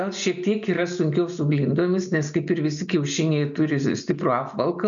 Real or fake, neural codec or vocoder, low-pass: real; none; 7.2 kHz